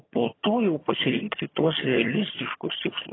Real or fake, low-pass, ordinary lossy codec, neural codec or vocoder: fake; 7.2 kHz; AAC, 16 kbps; vocoder, 22.05 kHz, 80 mel bands, HiFi-GAN